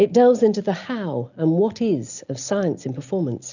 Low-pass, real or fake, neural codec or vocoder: 7.2 kHz; real; none